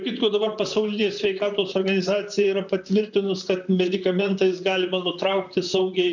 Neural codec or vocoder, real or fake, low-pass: vocoder, 44.1 kHz, 128 mel bands, Pupu-Vocoder; fake; 7.2 kHz